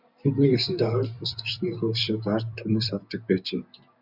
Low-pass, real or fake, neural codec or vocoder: 5.4 kHz; real; none